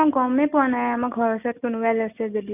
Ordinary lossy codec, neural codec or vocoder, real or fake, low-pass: none; none; real; 3.6 kHz